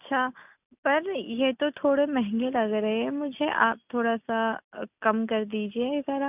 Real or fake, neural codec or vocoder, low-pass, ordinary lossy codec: real; none; 3.6 kHz; none